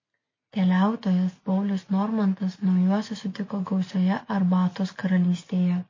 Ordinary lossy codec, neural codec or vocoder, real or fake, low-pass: MP3, 32 kbps; none; real; 7.2 kHz